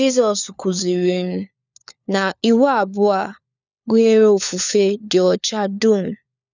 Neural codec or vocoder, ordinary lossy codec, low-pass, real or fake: codec, 16 kHz, 4 kbps, FunCodec, trained on LibriTTS, 50 frames a second; none; 7.2 kHz; fake